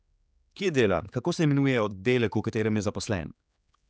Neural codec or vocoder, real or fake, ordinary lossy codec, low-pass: codec, 16 kHz, 4 kbps, X-Codec, HuBERT features, trained on general audio; fake; none; none